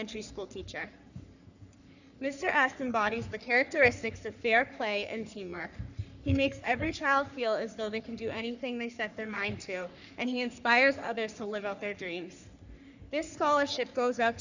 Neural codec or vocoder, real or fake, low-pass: codec, 44.1 kHz, 3.4 kbps, Pupu-Codec; fake; 7.2 kHz